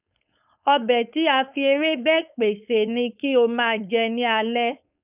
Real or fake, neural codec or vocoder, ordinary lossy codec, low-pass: fake; codec, 16 kHz, 4.8 kbps, FACodec; none; 3.6 kHz